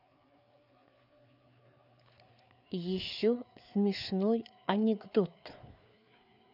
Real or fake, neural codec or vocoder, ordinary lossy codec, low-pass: fake; codec, 16 kHz, 4 kbps, FreqCodec, larger model; none; 5.4 kHz